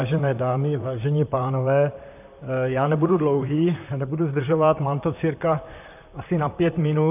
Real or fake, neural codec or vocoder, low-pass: fake; vocoder, 44.1 kHz, 128 mel bands, Pupu-Vocoder; 3.6 kHz